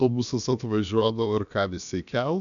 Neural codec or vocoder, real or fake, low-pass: codec, 16 kHz, about 1 kbps, DyCAST, with the encoder's durations; fake; 7.2 kHz